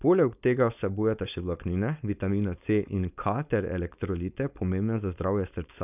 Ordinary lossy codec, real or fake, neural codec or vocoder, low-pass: none; fake; codec, 16 kHz, 4.8 kbps, FACodec; 3.6 kHz